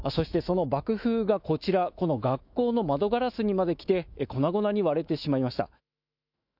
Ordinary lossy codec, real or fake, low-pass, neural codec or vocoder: none; fake; 5.4 kHz; autoencoder, 48 kHz, 128 numbers a frame, DAC-VAE, trained on Japanese speech